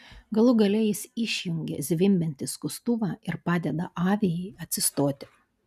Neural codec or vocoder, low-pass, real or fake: none; 14.4 kHz; real